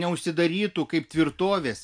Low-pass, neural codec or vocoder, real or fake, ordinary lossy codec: 9.9 kHz; none; real; MP3, 64 kbps